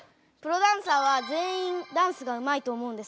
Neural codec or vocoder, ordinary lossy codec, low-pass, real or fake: none; none; none; real